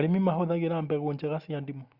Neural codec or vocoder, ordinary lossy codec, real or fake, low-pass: none; Opus, 64 kbps; real; 5.4 kHz